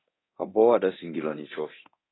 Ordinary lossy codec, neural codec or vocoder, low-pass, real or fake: AAC, 16 kbps; codec, 24 kHz, 0.9 kbps, DualCodec; 7.2 kHz; fake